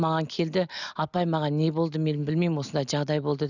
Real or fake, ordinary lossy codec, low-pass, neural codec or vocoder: real; none; 7.2 kHz; none